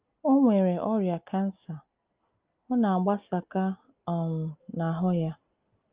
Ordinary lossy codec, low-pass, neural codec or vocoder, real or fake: Opus, 24 kbps; 3.6 kHz; none; real